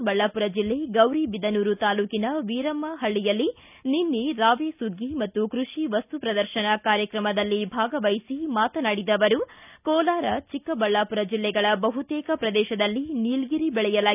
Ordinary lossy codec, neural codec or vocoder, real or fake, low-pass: none; none; real; 3.6 kHz